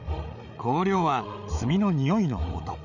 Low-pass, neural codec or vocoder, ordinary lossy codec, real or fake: 7.2 kHz; codec, 16 kHz, 8 kbps, FreqCodec, larger model; none; fake